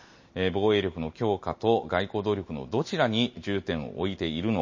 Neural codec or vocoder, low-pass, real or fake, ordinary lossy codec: none; 7.2 kHz; real; MP3, 32 kbps